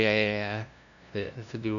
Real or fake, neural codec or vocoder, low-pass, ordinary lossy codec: fake; codec, 16 kHz, 0.5 kbps, FunCodec, trained on LibriTTS, 25 frames a second; 7.2 kHz; none